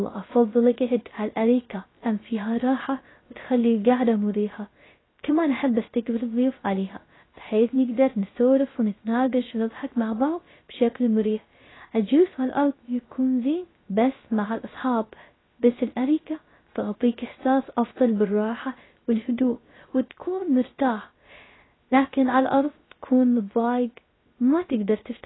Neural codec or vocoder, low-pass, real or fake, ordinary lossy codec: codec, 16 kHz, 0.3 kbps, FocalCodec; 7.2 kHz; fake; AAC, 16 kbps